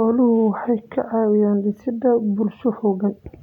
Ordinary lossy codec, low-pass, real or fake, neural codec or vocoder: none; 19.8 kHz; real; none